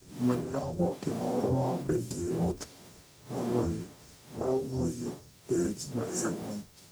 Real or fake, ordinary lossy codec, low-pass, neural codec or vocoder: fake; none; none; codec, 44.1 kHz, 0.9 kbps, DAC